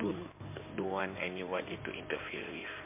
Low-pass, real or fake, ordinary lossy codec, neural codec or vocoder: 3.6 kHz; fake; MP3, 32 kbps; codec, 16 kHz in and 24 kHz out, 2.2 kbps, FireRedTTS-2 codec